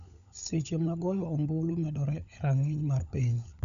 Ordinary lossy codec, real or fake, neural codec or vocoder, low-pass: none; fake; codec, 16 kHz, 16 kbps, FunCodec, trained on LibriTTS, 50 frames a second; 7.2 kHz